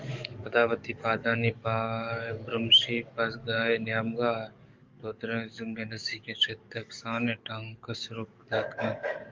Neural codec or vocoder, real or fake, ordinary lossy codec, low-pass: codec, 44.1 kHz, 7.8 kbps, DAC; fake; Opus, 24 kbps; 7.2 kHz